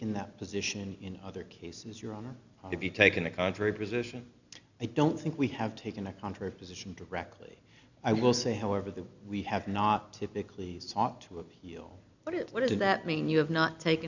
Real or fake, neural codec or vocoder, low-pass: real; none; 7.2 kHz